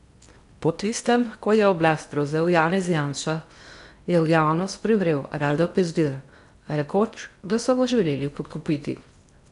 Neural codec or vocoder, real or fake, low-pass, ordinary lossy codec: codec, 16 kHz in and 24 kHz out, 0.6 kbps, FocalCodec, streaming, 4096 codes; fake; 10.8 kHz; none